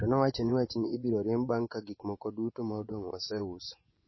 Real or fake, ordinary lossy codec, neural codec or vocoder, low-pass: fake; MP3, 24 kbps; vocoder, 24 kHz, 100 mel bands, Vocos; 7.2 kHz